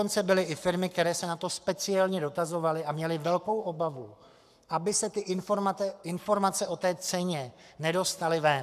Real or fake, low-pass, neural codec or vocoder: fake; 14.4 kHz; codec, 44.1 kHz, 7.8 kbps, Pupu-Codec